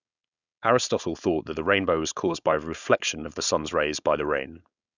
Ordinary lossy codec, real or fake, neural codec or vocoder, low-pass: none; fake; codec, 16 kHz, 4.8 kbps, FACodec; 7.2 kHz